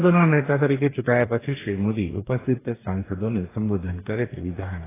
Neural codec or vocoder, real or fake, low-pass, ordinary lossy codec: codec, 44.1 kHz, 2.6 kbps, DAC; fake; 3.6 kHz; AAC, 16 kbps